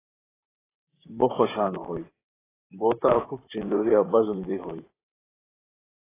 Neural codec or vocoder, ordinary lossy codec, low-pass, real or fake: vocoder, 44.1 kHz, 128 mel bands, Pupu-Vocoder; AAC, 16 kbps; 3.6 kHz; fake